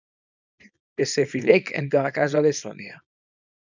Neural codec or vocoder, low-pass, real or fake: codec, 24 kHz, 0.9 kbps, WavTokenizer, small release; 7.2 kHz; fake